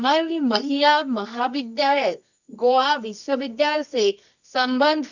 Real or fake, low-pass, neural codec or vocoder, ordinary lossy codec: fake; 7.2 kHz; codec, 24 kHz, 0.9 kbps, WavTokenizer, medium music audio release; none